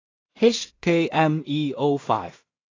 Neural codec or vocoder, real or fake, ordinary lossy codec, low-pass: codec, 16 kHz in and 24 kHz out, 0.4 kbps, LongCat-Audio-Codec, two codebook decoder; fake; AAC, 32 kbps; 7.2 kHz